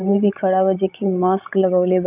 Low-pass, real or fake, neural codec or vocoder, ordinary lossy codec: 3.6 kHz; fake; codec, 16 kHz, 16 kbps, FreqCodec, larger model; none